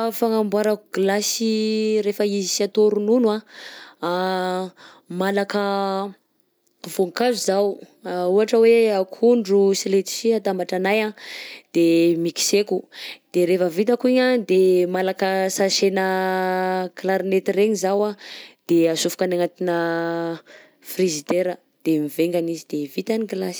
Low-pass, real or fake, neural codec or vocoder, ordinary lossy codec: none; real; none; none